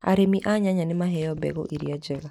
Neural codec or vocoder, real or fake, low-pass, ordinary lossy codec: none; real; 19.8 kHz; none